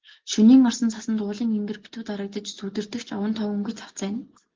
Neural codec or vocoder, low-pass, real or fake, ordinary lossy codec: none; 7.2 kHz; real; Opus, 16 kbps